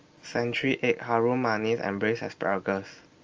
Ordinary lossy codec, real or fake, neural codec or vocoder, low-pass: Opus, 24 kbps; real; none; 7.2 kHz